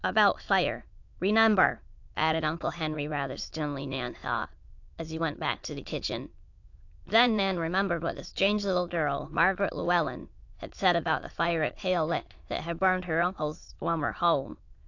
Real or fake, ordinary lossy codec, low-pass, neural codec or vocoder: fake; AAC, 48 kbps; 7.2 kHz; autoencoder, 22.05 kHz, a latent of 192 numbers a frame, VITS, trained on many speakers